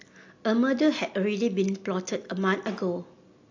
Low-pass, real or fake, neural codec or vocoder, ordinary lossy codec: 7.2 kHz; real; none; AAC, 48 kbps